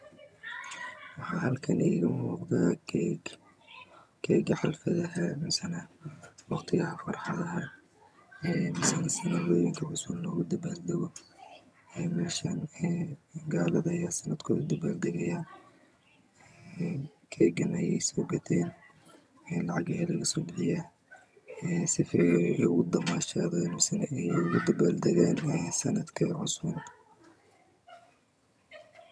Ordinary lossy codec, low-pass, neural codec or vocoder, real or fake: none; none; vocoder, 22.05 kHz, 80 mel bands, HiFi-GAN; fake